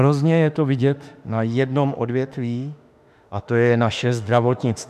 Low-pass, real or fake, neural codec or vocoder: 14.4 kHz; fake; autoencoder, 48 kHz, 32 numbers a frame, DAC-VAE, trained on Japanese speech